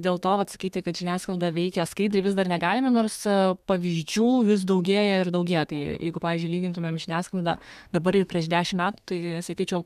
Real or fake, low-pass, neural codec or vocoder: fake; 14.4 kHz; codec, 32 kHz, 1.9 kbps, SNAC